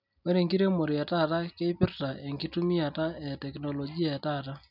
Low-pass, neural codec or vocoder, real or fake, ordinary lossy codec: 5.4 kHz; none; real; none